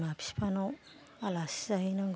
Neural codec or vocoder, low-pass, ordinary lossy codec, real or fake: none; none; none; real